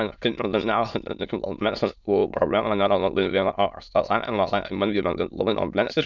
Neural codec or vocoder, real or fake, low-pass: autoencoder, 22.05 kHz, a latent of 192 numbers a frame, VITS, trained on many speakers; fake; 7.2 kHz